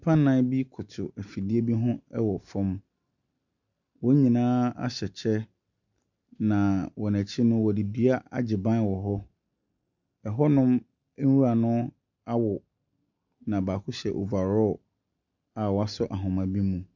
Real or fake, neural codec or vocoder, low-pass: real; none; 7.2 kHz